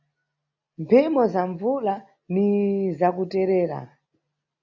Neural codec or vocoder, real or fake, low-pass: none; real; 7.2 kHz